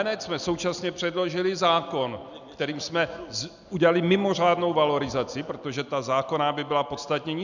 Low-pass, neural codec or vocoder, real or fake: 7.2 kHz; none; real